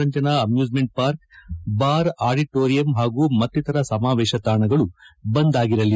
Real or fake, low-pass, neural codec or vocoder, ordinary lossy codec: real; none; none; none